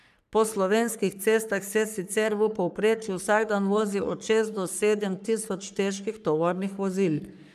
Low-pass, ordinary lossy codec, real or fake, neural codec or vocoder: 14.4 kHz; none; fake; codec, 44.1 kHz, 3.4 kbps, Pupu-Codec